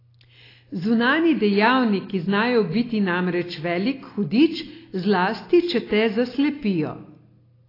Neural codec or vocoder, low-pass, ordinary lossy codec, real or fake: none; 5.4 kHz; AAC, 24 kbps; real